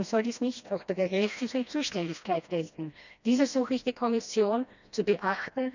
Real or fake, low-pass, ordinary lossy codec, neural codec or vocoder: fake; 7.2 kHz; none; codec, 16 kHz, 1 kbps, FreqCodec, smaller model